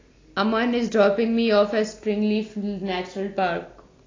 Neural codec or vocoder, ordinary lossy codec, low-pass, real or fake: none; AAC, 32 kbps; 7.2 kHz; real